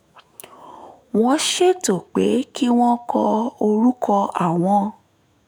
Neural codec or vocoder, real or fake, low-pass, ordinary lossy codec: autoencoder, 48 kHz, 128 numbers a frame, DAC-VAE, trained on Japanese speech; fake; none; none